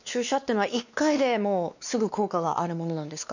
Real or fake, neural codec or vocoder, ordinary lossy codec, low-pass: fake; codec, 16 kHz, 2 kbps, X-Codec, WavLM features, trained on Multilingual LibriSpeech; none; 7.2 kHz